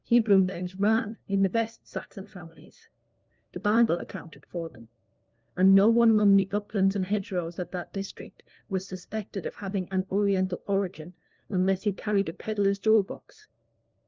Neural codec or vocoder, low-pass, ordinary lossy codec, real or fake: codec, 16 kHz, 1 kbps, FunCodec, trained on LibriTTS, 50 frames a second; 7.2 kHz; Opus, 32 kbps; fake